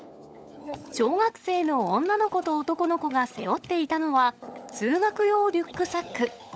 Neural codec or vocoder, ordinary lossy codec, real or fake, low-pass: codec, 16 kHz, 16 kbps, FunCodec, trained on LibriTTS, 50 frames a second; none; fake; none